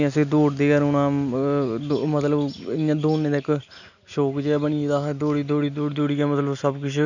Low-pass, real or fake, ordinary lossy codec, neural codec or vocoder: 7.2 kHz; real; none; none